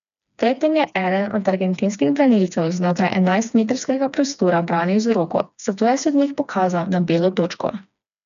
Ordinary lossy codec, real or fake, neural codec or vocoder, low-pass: none; fake; codec, 16 kHz, 2 kbps, FreqCodec, smaller model; 7.2 kHz